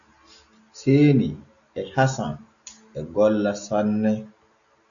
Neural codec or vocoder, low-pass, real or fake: none; 7.2 kHz; real